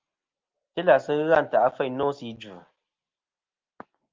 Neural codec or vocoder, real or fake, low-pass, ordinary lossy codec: none; real; 7.2 kHz; Opus, 32 kbps